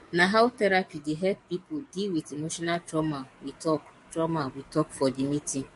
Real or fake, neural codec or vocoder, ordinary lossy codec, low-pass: fake; codec, 44.1 kHz, 7.8 kbps, DAC; MP3, 48 kbps; 14.4 kHz